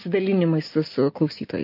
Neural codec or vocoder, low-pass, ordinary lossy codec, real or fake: none; 5.4 kHz; MP3, 32 kbps; real